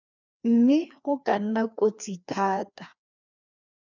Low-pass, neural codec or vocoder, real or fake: 7.2 kHz; codec, 16 kHz, 2 kbps, FreqCodec, larger model; fake